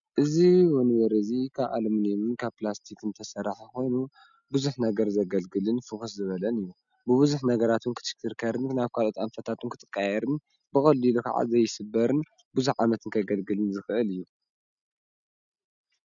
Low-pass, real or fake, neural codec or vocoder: 7.2 kHz; real; none